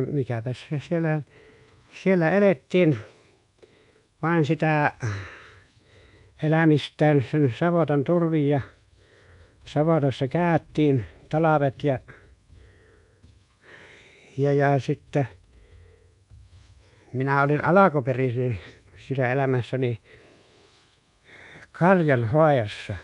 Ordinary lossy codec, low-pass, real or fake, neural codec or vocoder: none; 10.8 kHz; fake; codec, 24 kHz, 1.2 kbps, DualCodec